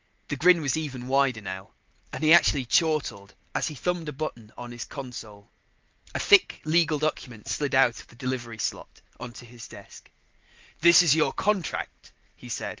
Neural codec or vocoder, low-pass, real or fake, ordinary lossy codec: none; 7.2 kHz; real; Opus, 24 kbps